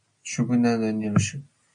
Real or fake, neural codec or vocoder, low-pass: real; none; 9.9 kHz